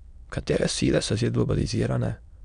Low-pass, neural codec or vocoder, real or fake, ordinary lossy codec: 9.9 kHz; autoencoder, 22.05 kHz, a latent of 192 numbers a frame, VITS, trained on many speakers; fake; none